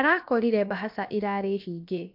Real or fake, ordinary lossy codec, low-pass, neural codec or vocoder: fake; MP3, 48 kbps; 5.4 kHz; codec, 16 kHz, about 1 kbps, DyCAST, with the encoder's durations